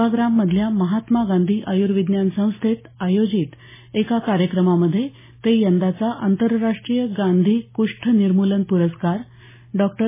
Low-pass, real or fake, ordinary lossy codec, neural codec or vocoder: 3.6 kHz; real; MP3, 16 kbps; none